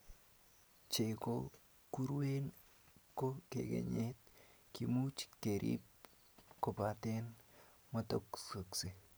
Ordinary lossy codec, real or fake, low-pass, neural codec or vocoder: none; real; none; none